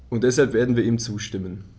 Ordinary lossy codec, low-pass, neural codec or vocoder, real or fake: none; none; none; real